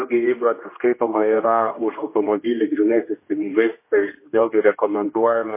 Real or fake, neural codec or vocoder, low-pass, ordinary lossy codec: fake; codec, 16 kHz, 1 kbps, X-Codec, HuBERT features, trained on general audio; 3.6 kHz; MP3, 24 kbps